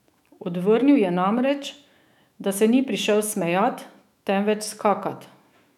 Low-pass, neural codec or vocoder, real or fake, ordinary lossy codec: 19.8 kHz; autoencoder, 48 kHz, 128 numbers a frame, DAC-VAE, trained on Japanese speech; fake; none